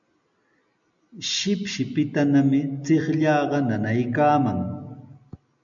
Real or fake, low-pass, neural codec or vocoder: real; 7.2 kHz; none